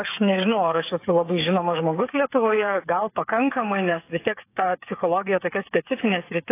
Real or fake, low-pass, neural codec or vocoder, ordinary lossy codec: fake; 3.6 kHz; codec, 16 kHz, 8 kbps, FreqCodec, smaller model; AAC, 24 kbps